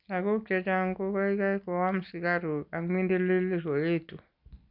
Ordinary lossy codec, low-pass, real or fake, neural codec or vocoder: none; 5.4 kHz; real; none